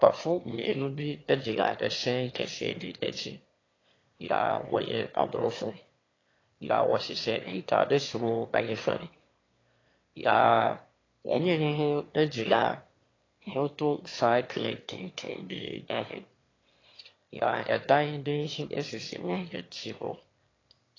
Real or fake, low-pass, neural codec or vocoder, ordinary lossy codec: fake; 7.2 kHz; autoencoder, 22.05 kHz, a latent of 192 numbers a frame, VITS, trained on one speaker; AAC, 32 kbps